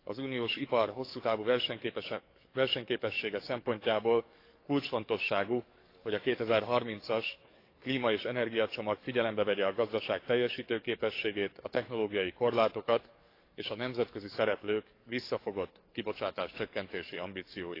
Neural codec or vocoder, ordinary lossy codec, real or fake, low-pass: codec, 44.1 kHz, 7.8 kbps, DAC; AAC, 32 kbps; fake; 5.4 kHz